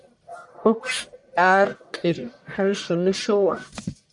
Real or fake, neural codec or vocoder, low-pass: fake; codec, 44.1 kHz, 1.7 kbps, Pupu-Codec; 10.8 kHz